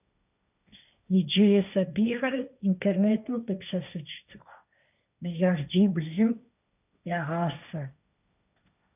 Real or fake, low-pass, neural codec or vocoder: fake; 3.6 kHz; codec, 16 kHz, 1.1 kbps, Voila-Tokenizer